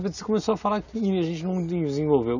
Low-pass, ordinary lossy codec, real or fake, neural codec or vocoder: 7.2 kHz; none; real; none